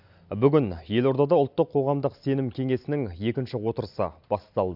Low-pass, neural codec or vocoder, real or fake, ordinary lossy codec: 5.4 kHz; none; real; none